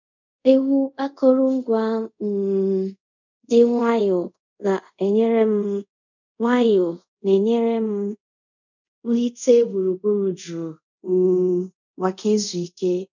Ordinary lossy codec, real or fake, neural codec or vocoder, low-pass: none; fake; codec, 24 kHz, 0.5 kbps, DualCodec; 7.2 kHz